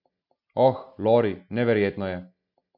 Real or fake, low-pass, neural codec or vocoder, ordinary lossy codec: real; 5.4 kHz; none; none